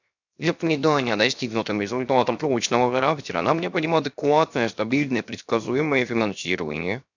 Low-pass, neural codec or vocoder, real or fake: 7.2 kHz; codec, 16 kHz, 0.7 kbps, FocalCodec; fake